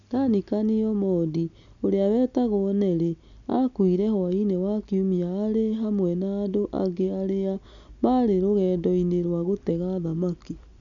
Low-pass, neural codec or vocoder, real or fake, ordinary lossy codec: 7.2 kHz; none; real; none